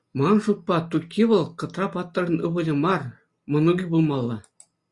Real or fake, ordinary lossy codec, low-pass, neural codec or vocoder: real; Opus, 64 kbps; 10.8 kHz; none